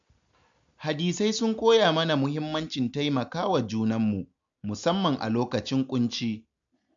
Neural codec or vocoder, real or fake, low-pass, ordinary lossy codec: none; real; 7.2 kHz; MP3, 64 kbps